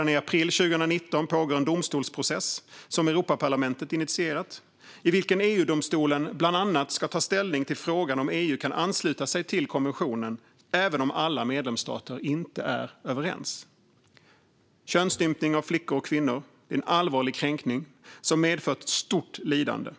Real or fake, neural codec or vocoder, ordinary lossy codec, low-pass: real; none; none; none